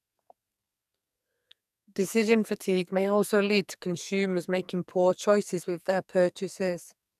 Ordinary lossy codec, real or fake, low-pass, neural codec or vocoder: none; fake; 14.4 kHz; codec, 44.1 kHz, 2.6 kbps, SNAC